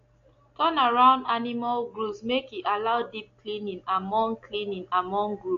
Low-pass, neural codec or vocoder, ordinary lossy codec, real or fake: 7.2 kHz; none; AAC, 48 kbps; real